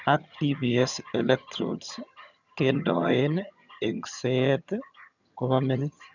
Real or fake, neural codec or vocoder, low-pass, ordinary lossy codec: fake; vocoder, 22.05 kHz, 80 mel bands, HiFi-GAN; 7.2 kHz; none